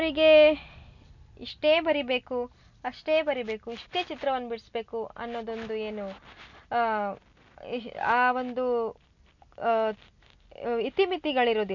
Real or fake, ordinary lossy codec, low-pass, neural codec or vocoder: real; none; 7.2 kHz; none